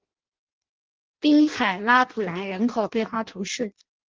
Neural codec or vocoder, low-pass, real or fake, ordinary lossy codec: codec, 16 kHz in and 24 kHz out, 0.6 kbps, FireRedTTS-2 codec; 7.2 kHz; fake; Opus, 16 kbps